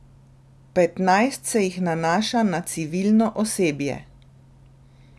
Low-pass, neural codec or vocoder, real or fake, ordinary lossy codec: none; none; real; none